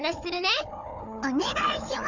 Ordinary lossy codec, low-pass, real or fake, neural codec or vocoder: none; 7.2 kHz; fake; codec, 16 kHz, 4 kbps, FunCodec, trained on Chinese and English, 50 frames a second